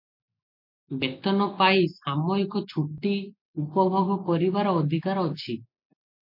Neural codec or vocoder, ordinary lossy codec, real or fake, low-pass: none; MP3, 48 kbps; real; 5.4 kHz